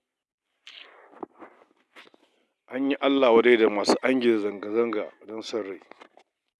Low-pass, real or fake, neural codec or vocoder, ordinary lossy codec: none; real; none; none